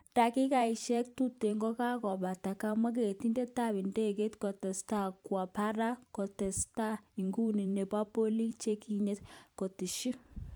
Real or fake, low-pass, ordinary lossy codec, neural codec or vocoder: fake; none; none; vocoder, 44.1 kHz, 128 mel bands every 512 samples, BigVGAN v2